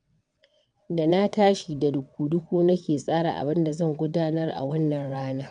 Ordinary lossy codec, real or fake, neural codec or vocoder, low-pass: none; fake; vocoder, 22.05 kHz, 80 mel bands, WaveNeXt; 9.9 kHz